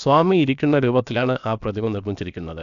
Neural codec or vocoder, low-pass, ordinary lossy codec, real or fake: codec, 16 kHz, about 1 kbps, DyCAST, with the encoder's durations; 7.2 kHz; none; fake